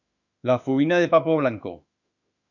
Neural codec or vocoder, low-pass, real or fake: autoencoder, 48 kHz, 32 numbers a frame, DAC-VAE, trained on Japanese speech; 7.2 kHz; fake